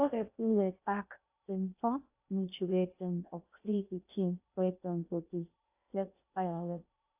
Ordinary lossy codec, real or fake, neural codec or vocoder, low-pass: AAC, 32 kbps; fake; codec, 16 kHz in and 24 kHz out, 0.6 kbps, FocalCodec, streaming, 2048 codes; 3.6 kHz